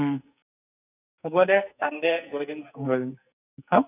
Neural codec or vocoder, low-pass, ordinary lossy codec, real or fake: codec, 44.1 kHz, 2.6 kbps, SNAC; 3.6 kHz; none; fake